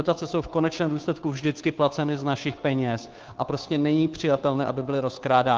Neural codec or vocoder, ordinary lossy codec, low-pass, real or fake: codec, 16 kHz, 2 kbps, FunCodec, trained on Chinese and English, 25 frames a second; Opus, 24 kbps; 7.2 kHz; fake